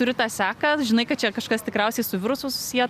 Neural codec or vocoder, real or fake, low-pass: none; real; 14.4 kHz